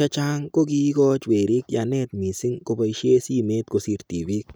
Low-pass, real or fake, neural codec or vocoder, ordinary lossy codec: none; real; none; none